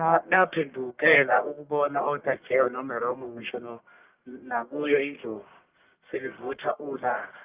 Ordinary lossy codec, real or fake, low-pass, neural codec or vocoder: Opus, 24 kbps; fake; 3.6 kHz; codec, 44.1 kHz, 1.7 kbps, Pupu-Codec